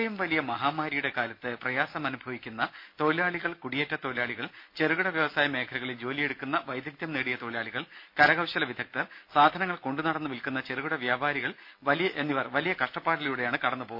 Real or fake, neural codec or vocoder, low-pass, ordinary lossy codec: real; none; 5.4 kHz; MP3, 32 kbps